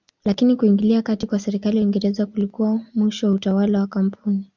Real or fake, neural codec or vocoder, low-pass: real; none; 7.2 kHz